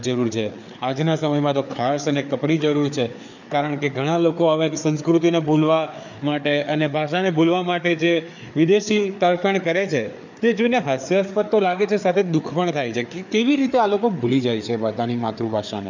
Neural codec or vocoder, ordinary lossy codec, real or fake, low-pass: codec, 16 kHz, 4 kbps, FreqCodec, larger model; none; fake; 7.2 kHz